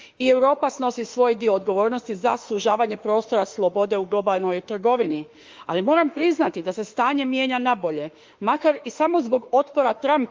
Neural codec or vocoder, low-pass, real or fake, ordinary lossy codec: autoencoder, 48 kHz, 32 numbers a frame, DAC-VAE, trained on Japanese speech; 7.2 kHz; fake; Opus, 24 kbps